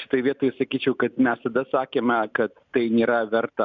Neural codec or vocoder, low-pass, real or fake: none; 7.2 kHz; real